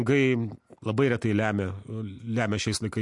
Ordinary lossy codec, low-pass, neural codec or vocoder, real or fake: MP3, 64 kbps; 10.8 kHz; none; real